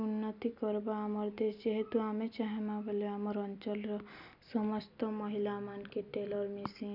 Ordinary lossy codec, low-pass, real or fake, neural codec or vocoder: none; 5.4 kHz; real; none